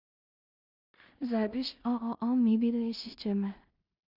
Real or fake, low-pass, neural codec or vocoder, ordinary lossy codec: fake; 5.4 kHz; codec, 16 kHz in and 24 kHz out, 0.4 kbps, LongCat-Audio-Codec, two codebook decoder; Opus, 64 kbps